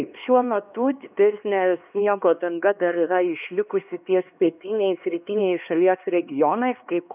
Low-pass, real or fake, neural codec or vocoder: 3.6 kHz; fake; codec, 16 kHz, 2 kbps, X-Codec, HuBERT features, trained on LibriSpeech